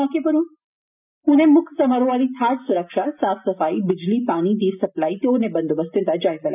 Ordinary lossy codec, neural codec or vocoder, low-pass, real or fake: none; none; 3.6 kHz; real